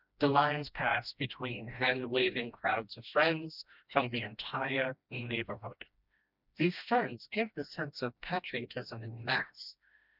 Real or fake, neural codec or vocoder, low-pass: fake; codec, 16 kHz, 1 kbps, FreqCodec, smaller model; 5.4 kHz